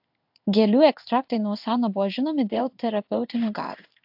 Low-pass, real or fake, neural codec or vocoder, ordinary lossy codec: 5.4 kHz; fake; codec, 16 kHz in and 24 kHz out, 1 kbps, XY-Tokenizer; AAC, 48 kbps